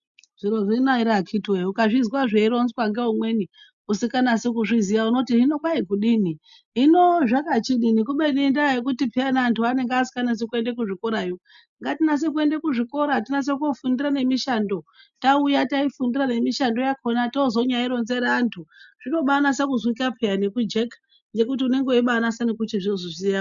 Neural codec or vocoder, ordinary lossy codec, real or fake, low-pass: none; MP3, 96 kbps; real; 7.2 kHz